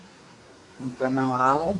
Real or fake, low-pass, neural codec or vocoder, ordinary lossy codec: fake; 10.8 kHz; codec, 24 kHz, 1 kbps, SNAC; AAC, 48 kbps